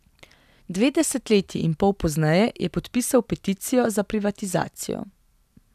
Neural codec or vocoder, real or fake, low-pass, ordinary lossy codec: none; real; 14.4 kHz; none